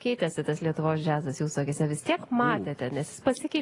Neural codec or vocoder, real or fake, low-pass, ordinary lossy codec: none; real; 10.8 kHz; AAC, 32 kbps